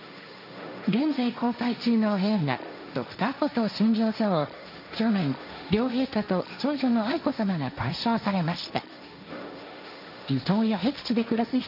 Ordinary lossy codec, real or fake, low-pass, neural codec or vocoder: none; fake; 5.4 kHz; codec, 16 kHz, 1.1 kbps, Voila-Tokenizer